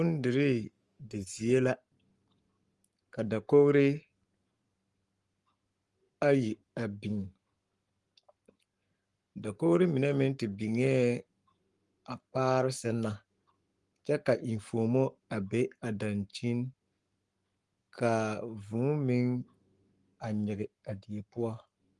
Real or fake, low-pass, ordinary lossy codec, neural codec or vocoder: fake; 10.8 kHz; Opus, 24 kbps; codec, 44.1 kHz, 7.8 kbps, Pupu-Codec